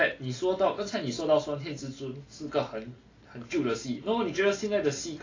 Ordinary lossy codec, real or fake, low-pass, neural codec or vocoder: AAC, 32 kbps; real; 7.2 kHz; none